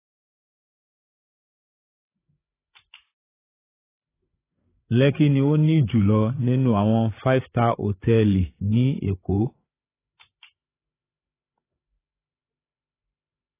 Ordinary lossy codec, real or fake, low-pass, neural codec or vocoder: AAC, 16 kbps; fake; 3.6 kHz; codec, 16 kHz, 16 kbps, FreqCodec, larger model